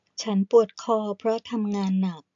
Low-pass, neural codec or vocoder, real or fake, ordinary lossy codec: 7.2 kHz; none; real; AAC, 64 kbps